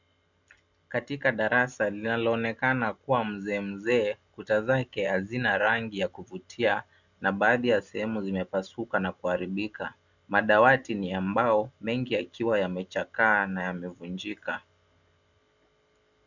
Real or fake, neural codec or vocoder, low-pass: real; none; 7.2 kHz